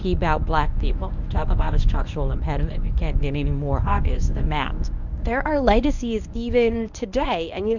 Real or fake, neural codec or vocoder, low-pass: fake; codec, 24 kHz, 0.9 kbps, WavTokenizer, medium speech release version 1; 7.2 kHz